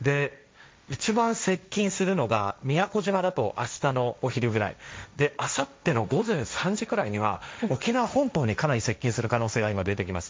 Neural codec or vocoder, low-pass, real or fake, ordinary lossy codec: codec, 16 kHz, 1.1 kbps, Voila-Tokenizer; none; fake; none